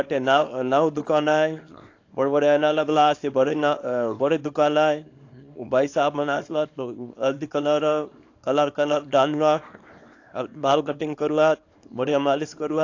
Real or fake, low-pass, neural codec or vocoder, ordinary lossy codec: fake; 7.2 kHz; codec, 24 kHz, 0.9 kbps, WavTokenizer, small release; AAC, 48 kbps